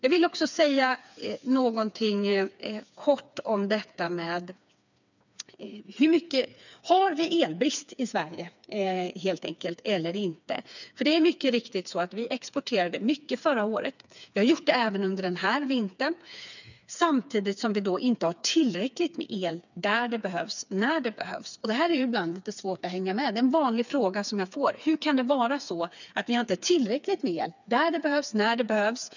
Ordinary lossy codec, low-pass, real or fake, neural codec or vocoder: none; 7.2 kHz; fake; codec, 16 kHz, 4 kbps, FreqCodec, smaller model